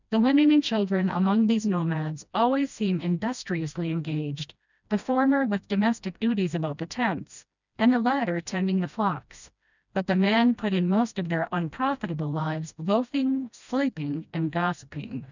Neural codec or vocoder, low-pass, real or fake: codec, 16 kHz, 1 kbps, FreqCodec, smaller model; 7.2 kHz; fake